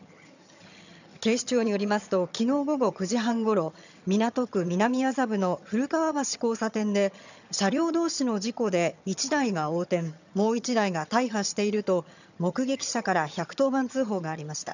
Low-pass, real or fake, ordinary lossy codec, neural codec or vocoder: 7.2 kHz; fake; none; vocoder, 22.05 kHz, 80 mel bands, HiFi-GAN